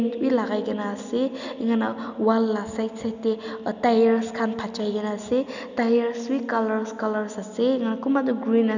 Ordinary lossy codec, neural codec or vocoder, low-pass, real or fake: none; none; 7.2 kHz; real